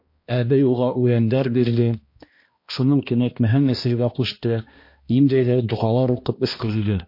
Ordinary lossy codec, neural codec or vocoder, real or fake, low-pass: MP3, 32 kbps; codec, 16 kHz, 1 kbps, X-Codec, HuBERT features, trained on balanced general audio; fake; 5.4 kHz